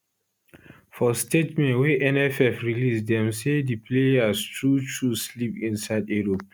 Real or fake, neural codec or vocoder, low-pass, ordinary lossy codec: real; none; none; none